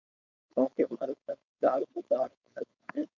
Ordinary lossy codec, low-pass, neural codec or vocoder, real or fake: MP3, 48 kbps; 7.2 kHz; codec, 16 kHz, 4.8 kbps, FACodec; fake